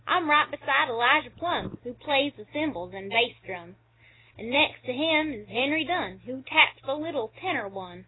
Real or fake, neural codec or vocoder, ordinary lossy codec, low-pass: real; none; AAC, 16 kbps; 7.2 kHz